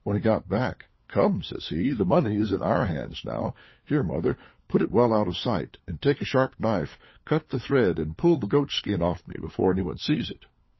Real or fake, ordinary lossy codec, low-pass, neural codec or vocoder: fake; MP3, 24 kbps; 7.2 kHz; codec, 16 kHz, 4 kbps, FunCodec, trained on LibriTTS, 50 frames a second